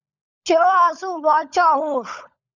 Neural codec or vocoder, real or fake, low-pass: codec, 16 kHz, 16 kbps, FunCodec, trained on LibriTTS, 50 frames a second; fake; 7.2 kHz